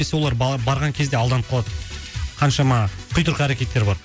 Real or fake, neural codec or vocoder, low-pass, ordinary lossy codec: real; none; none; none